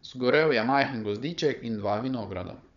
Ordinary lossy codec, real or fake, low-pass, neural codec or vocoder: none; fake; 7.2 kHz; codec, 16 kHz, 16 kbps, FunCodec, trained on Chinese and English, 50 frames a second